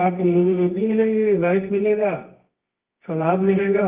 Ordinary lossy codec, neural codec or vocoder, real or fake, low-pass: Opus, 32 kbps; codec, 24 kHz, 0.9 kbps, WavTokenizer, medium music audio release; fake; 3.6 kHz